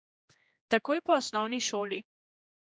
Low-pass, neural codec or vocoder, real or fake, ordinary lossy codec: none; codec, 16 kHz, 2 kbps, X-Codec, HuBERT features, trained on general audio; fake; none